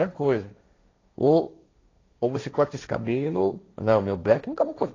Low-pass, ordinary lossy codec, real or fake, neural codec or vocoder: 7.2 kHz; AAC, 32 kbps; fake; codec, 16 kHz, 1.1 kbps, Voila-Tokenizer